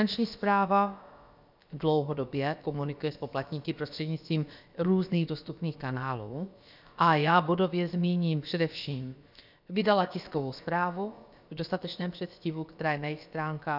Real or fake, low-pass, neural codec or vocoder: fake; 5.4 kHz; codec, 16 kHz, about 1 kbps, DyCAST, with the encoder's durations